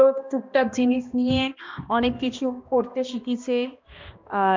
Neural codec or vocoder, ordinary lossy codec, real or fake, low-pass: codec, 16 kHz, 1 kbps, X-Codec, HuBERT features, trained on balanced general audio; MP3, 64 kbps; fake; 7.2 kHz